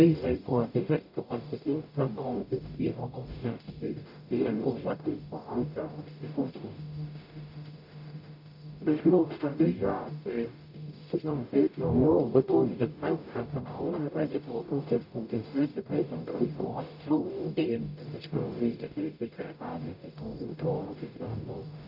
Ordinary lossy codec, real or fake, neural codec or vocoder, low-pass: none; fake; codec, 44.1 kHz, 0.9 kbps, DAC; 5.4 kHz